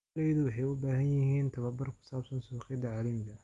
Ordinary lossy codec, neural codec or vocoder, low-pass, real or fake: Opus, 32 kbps; none; 10.8 kHz; real